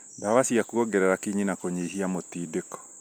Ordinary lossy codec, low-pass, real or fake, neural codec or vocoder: none; none; real; none